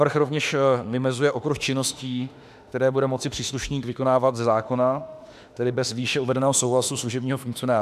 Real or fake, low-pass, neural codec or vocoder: fake; 14.4 kHz; autoencoder, 48 kHz, 32 numbers a frame, DAC-VAE, trained on Japanese speech